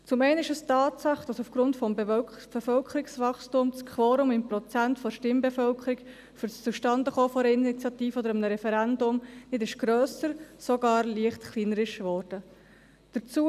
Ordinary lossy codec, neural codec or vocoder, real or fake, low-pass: none; none; real; 14.4 kHz